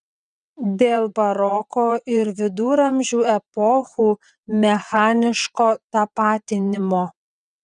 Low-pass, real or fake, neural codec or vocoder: 9.9 kHz; fake; vocoder, 22.05 kHz, 80 mel bands, WaveNeXt